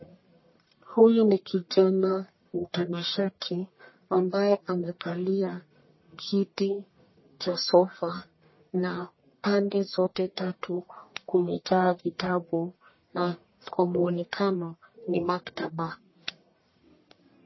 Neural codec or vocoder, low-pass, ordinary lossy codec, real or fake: codec, 44.1 kHz, 1.7 kbps, Pupu-Codec; 7.2 kHz; MP3, 24 kbps; fake